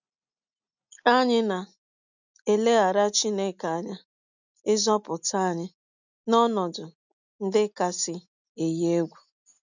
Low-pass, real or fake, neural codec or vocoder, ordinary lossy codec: 7.2 kHz; real; none; none